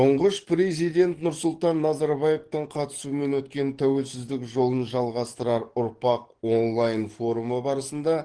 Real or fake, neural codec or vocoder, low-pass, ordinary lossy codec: fake; codec, 44.1 kHz, 7.8 kbps, DAC; 9.9 kHz; Opus, 16 kbps